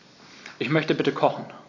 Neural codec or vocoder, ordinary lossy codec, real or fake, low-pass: none; AAC, 48 kbps; real; 7.2 kHz